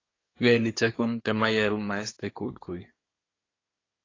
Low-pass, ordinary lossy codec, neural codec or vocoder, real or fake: 7.2 kHz; AAC, 32 kbps; codec, 24 kHz, 1 kbps, SNAC; fake